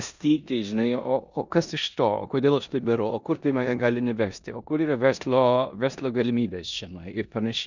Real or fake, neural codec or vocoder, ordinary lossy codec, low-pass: fake; codec, 16 kHz in and 24 kHz out, 0.9 kbps, LongCat-Audio-Codec, four codebook decoder; Opus, 64 kbps; 7.2 kHz